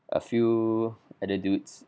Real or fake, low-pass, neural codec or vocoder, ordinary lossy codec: real; none; none; none